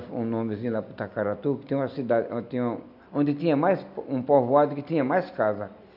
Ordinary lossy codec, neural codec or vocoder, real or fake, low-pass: none; none; real; 5.4 kHz